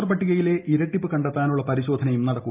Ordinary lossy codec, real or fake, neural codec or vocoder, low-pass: Opus, 24 kbps; real; none; 3.6 kHz